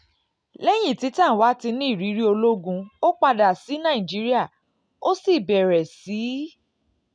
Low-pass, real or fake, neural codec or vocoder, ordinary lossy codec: 9.9 kHz; real; none; none